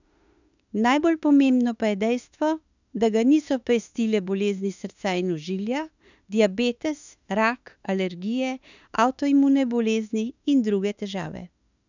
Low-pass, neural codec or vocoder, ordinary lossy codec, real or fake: 7.2 kHz; autoencoder, 48 kHz, 32 numbers a frame, DAC-VAE, trained on Japanese speech; none; fake